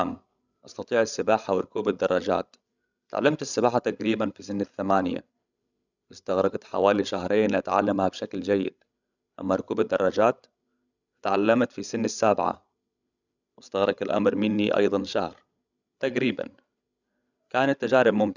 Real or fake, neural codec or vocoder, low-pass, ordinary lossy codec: fake; codec, 16 kHz, 16 kbps, FreqCodec, larger model; 7.2 kHz; none